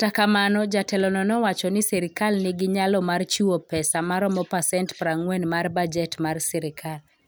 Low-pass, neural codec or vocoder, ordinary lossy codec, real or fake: none; none; none; real